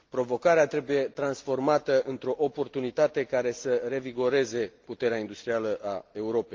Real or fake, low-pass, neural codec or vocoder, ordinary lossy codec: real; 7.2 kHz; none; Opus, 32 kbps